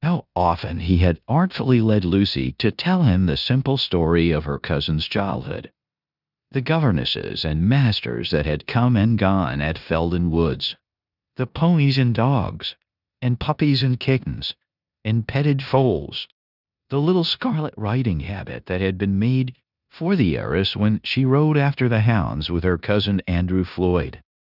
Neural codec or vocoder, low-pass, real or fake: codec, 16 kHz in and 24 kHz out, 0.9 kbps, LongCat-Audio-Codec, four codebook decoder; 5.4 kHz; fake